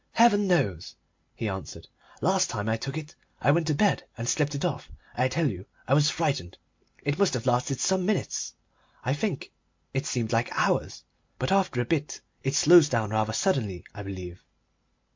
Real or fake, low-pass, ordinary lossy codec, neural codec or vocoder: real; 7.2 kHz; MP3, 48 kbps; none